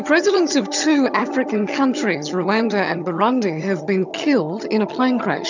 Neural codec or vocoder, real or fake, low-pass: vocoder, 22.05 kHz, 80 mel bands, HiFi-GAN; fake; 7.2 kHz